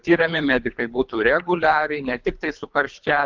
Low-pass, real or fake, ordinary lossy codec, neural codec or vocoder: 7.2 kHz; fake; Opus, 32 kbps; codec, 24 kHz, 3 kbps, HILCodec